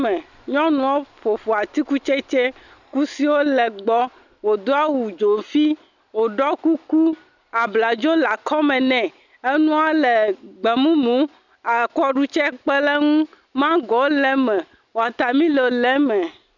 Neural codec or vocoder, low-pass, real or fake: none; 7.2 kHz; real